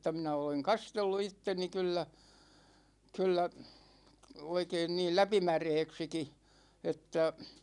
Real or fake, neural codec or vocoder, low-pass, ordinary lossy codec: real; none; 10.8 kHz; none